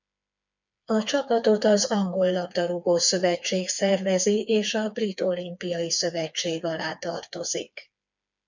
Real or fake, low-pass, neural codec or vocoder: fake; 7.2 kHz; codec, 16 kHz, 4 kbps, FreqCodec, smaller model